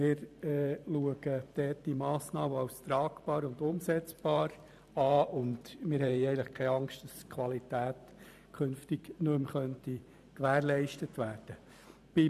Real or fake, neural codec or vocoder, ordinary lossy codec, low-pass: fake; vocoder, 44.1 kHz, 128 mel bands every 512 samples, BigVGAN v2; none; 14.4 kHz